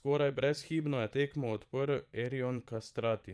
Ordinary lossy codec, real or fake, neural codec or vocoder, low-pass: none; fake; vocoder, 22.05 kHz, 80 mel bands, Vocos; none